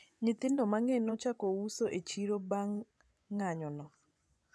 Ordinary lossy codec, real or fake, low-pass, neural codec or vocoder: none; fake; none; vocoder, 24 kHz, 100 mel bands, Vocos